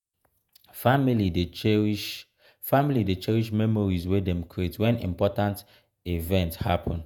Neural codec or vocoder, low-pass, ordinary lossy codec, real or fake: vocoder, 48 kHz, 128 mel bands, Vocos; none; none; fake